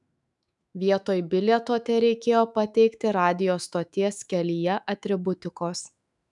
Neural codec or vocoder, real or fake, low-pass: autoencoder, 48 kHz, 128 numbers a frame, DAC-VAE, trained on Japanese speech; fake; 10.8 kHz